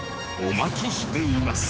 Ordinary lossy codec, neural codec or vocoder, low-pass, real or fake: none; codec, 16 kHz, 4 kbps, X-Codec, HuBERT features, trained on general audio; none; fake